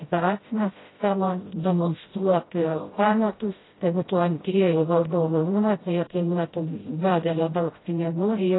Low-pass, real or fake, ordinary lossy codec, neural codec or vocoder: 7.2 kHz; fake; AAC, 16 kbps; codec, 16 kHz, 0.5 kbps, FreqCodec, smaller model